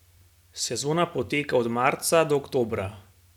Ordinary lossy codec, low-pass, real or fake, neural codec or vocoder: none; 19.8 kHz; real; none